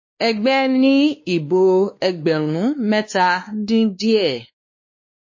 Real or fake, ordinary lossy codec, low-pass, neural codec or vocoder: fake; MP3, 32 kbps; 7.2 kHz; codec, 16 kHz, 2 kbps, X-Codec, WavLM features, trained on Multilingual LibriSpeech